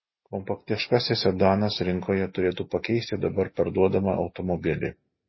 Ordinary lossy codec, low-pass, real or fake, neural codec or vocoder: MP3, 24 kbps; 7.2 kHz; real; none